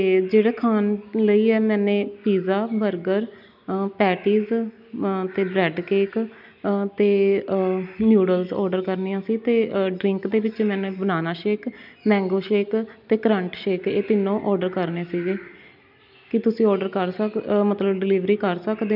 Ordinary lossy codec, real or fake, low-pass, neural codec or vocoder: none; real; 5.4 kHz; none